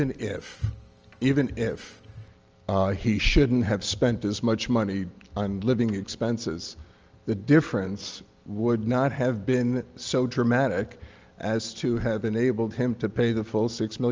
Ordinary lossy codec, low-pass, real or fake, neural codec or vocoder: Opus, 24 kbps; 7.2 kHz; real; none